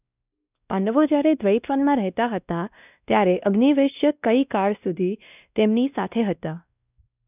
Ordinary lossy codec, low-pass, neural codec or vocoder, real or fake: none; 3.6 kHz; codec, 16 kHz, 1 kbps, X-Codec, WavLM features, trained on Multilingual LibriSpeech; fake